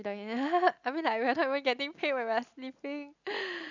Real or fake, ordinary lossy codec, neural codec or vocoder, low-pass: real; none; none; 7.2 kHz